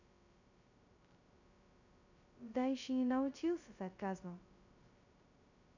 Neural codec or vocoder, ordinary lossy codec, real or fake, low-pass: codec, 16 kHz, 0.2 kbps, FocalCodec; none; fake; 7.2 kHz